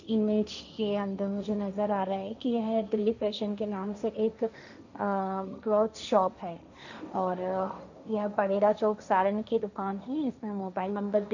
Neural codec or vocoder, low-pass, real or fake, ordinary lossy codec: codec, 16 kHz, 1.1 kbps, Voila-Tokenizer; 7.2 kHz; fake; none